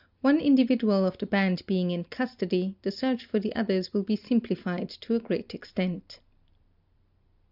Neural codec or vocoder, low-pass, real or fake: none; 5.4 kHz; real